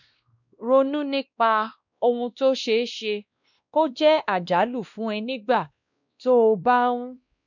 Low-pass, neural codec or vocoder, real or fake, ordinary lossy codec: 7.2 kHz; codec, 16 kHz, 1 kbps, X-Codec, WavLM features, trained on Multilingual LibriSpeech; fake; none